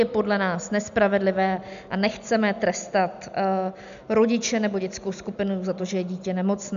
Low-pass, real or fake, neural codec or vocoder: 7.2 kHz; real; none